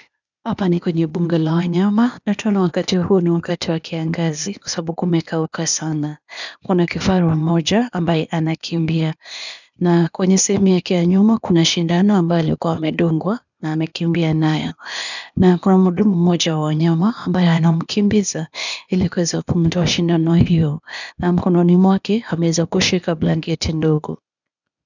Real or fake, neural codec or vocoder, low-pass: fake; codec, 16 kHz, 0.8 kbps, ZipCodec; 7.2 kHz